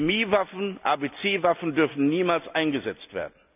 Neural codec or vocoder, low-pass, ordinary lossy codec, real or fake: none; 3.6 kHz; none; real